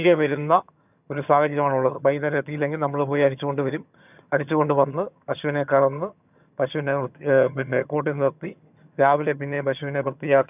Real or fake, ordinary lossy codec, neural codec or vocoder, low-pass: fake; none; vocoder, 22.05 kHz, 80 mel bands, HiFi-GAN; 3.6 kHz